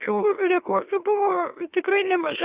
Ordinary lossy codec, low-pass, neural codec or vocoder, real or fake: Opus, 64 kbps; 3.6 kHz; autoencoder, 44.1 kHz, a latent of 192 numbers a frame, MeloTTS; fake